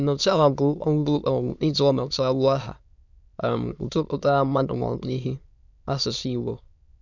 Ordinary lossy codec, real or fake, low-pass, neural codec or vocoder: none; fake; 7.2 kHz; autoencoder, 22.05 kHz, a latent of 192 numbers a frame, VITS, trained on many speakers